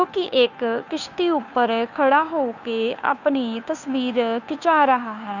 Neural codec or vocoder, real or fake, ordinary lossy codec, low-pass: codec, 16 kHz, 2 kbps, FunCodec, trained on Chinese and English, 25 frames a second; fake; none; 7.2 kHz